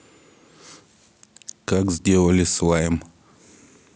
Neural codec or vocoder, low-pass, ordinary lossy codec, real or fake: none; none; none; real